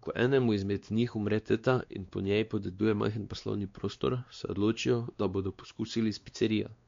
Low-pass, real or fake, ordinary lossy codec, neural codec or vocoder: 7.2 kHz; fake; MP3, 48 kbps; codec, 16 kHz, 2 kbps, X-Codec, WavLM features, trained on Multilingual LibriSpeech